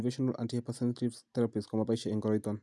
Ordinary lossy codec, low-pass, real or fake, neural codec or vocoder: none; none; real; none